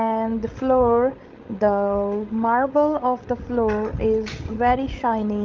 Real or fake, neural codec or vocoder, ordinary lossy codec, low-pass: fake; codec, 16 kHz, 16 kbps, FreqCodec, larger model; Opus, 32 kbps; 7.2 kHz